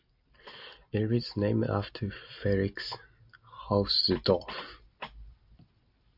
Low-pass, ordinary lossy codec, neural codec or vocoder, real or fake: 5.4 kHz; AAC, 48 kbps; none; real